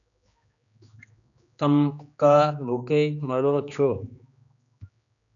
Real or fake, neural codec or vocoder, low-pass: fake; codec, 16 kHz, 2 kbps, X-Codec, HuBERT features, trained on general audio; 7.2 kHz